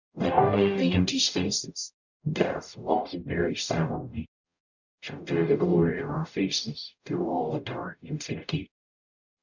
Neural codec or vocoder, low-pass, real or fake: codec, 44.1 kHz, 0.9 kbps, DAC; 7.2 kHz; fake